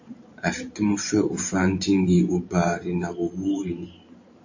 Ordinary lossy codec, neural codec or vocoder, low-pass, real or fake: AAC, 48 kbps; vocoder, 44.1 kHz, 128 mel bands every 512 samples, BigVGAN v2; 7.2 kHz; fake